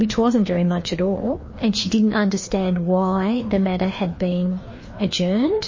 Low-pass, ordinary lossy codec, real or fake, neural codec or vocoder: 7.2 kHz; MP3, 32 kbps; fake; codec, 16 kHz, 2 kbps, FreqCodec, larger model